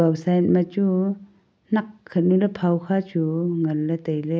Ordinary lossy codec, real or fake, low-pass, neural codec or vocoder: none; real; none; none